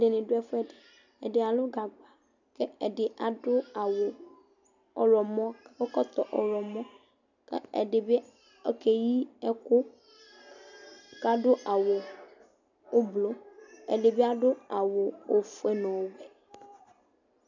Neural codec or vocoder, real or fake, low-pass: none; real; 7.2 kHz